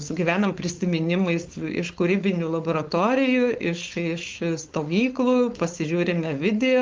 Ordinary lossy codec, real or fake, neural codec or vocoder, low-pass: Opus, 32 kbps; fake; codec, 16 kHz, 4.8 kbps, FACodec; 7.2 kHz